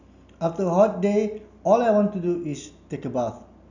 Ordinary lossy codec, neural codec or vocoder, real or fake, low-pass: none; none; real; 7.2 kHz